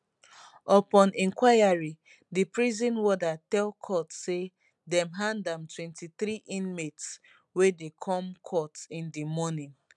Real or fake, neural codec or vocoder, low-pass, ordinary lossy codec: real; none; 10.8 kHz; none